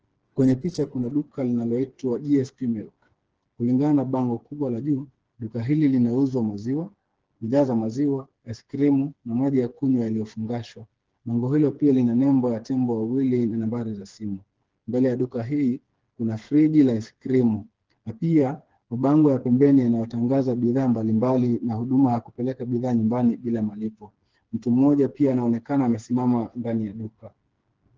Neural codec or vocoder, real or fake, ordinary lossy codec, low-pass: codec, 16 kHz, 4 kbps, FreqCodec, smaller model; fake; Opus, 16 kbps; 7.2 kHz